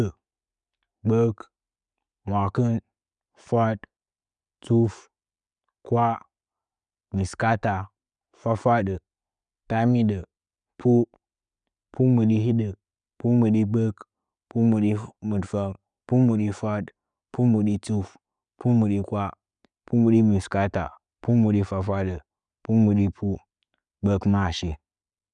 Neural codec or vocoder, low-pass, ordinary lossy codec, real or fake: none; 9.9 kHz; none; real